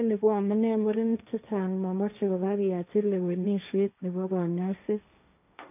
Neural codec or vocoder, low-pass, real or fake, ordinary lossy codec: codec, 16 kHz, 1.1 kbps, Voila-Tokenizer; 3.6 kHz; fake; none